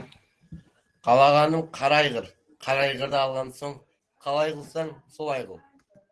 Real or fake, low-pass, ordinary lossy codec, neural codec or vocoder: real; 10.8 kHz; Opus, 16 kbps; none